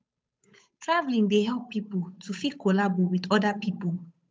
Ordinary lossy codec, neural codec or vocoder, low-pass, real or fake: none; codec, 16 kHz, 8 kbps, FunCodec, trained on Chinese and English, 25 frames a second; none; fake